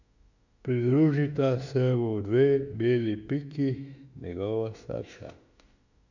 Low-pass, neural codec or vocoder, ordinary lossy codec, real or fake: 7.2 kHz; autoencoder, 48 kHz, 32 numbers a frame, DAC-VAE, trained on Japanese speech; none; fake